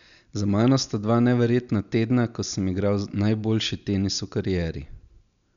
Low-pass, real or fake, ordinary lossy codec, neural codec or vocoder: 7.2 kHz; real; none; none